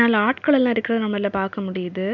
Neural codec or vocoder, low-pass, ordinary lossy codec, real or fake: none; 7.2 kHz; none; real